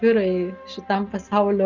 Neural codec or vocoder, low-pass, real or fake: none; 7.2 kHz; real